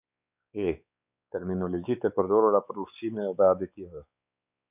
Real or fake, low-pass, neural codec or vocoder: fake; 3.6 kHz; codec, 16 kHz, 4 kbps, X-Codec, WavLM features, trained on Multilingual LibriSpeech